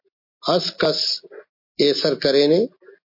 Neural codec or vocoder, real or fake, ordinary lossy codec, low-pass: none; real; MP3, 32 kbps; 5.4 kHz